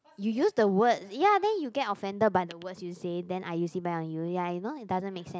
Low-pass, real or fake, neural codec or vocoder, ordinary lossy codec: none; real; none; none